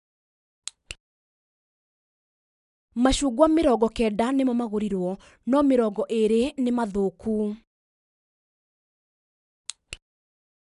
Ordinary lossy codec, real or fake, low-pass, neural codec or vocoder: none; real; 10.8 kHz; none